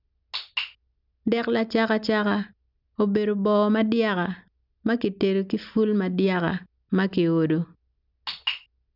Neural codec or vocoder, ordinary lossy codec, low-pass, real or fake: none; none; 5.4 kHz; real